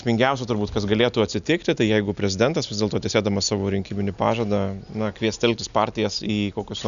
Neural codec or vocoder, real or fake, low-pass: none; real; 7.2 kHz